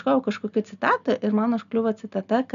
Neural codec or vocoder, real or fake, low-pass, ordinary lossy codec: none; real; 7.2 kHz; AAC, 96 kbps